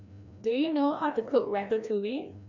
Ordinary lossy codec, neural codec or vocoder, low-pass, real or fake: none; codec, 16 kHz, 1 kbps, FreqCodec, larger model; 7.2 kHz; fake